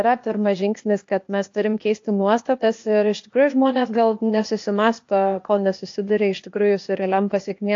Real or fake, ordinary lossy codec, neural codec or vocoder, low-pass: fake; AAC, 48 kbps; codec, 16 kHz, 0.8 kbps, ZipCodec; 7.2 kHz